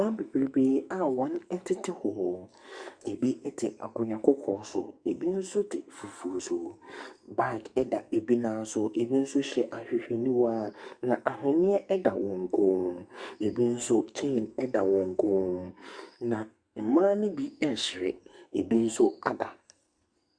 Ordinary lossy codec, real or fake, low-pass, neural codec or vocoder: Opus, 64 kbps; fake; 9.9 kHz; codec, 44.1 kHz, 2.6 kbps, SNAC